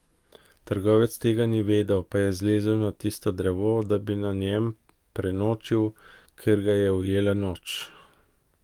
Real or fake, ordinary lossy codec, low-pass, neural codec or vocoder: fake; Opus, 32 kbps; 19.8 kHz; codec, 44.1 kHz, 7.8 kbps, DAC